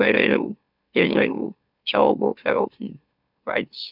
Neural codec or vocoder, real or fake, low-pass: autoencoder, 44.1 kHz, a latent of 192 numbers a frame, MeloTTS; fake; 5.4 kHz